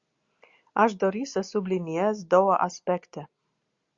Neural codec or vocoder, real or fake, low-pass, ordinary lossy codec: none; real; 7.2 kHz; Opus, 64 kbps